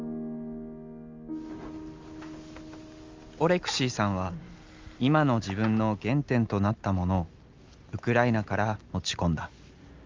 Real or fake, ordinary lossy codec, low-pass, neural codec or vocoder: real; Opus, 32 kbps; 7.2 kHz; none